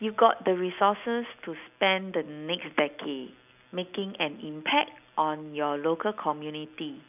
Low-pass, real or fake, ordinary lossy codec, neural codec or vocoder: 3.6 kHz; real; none; none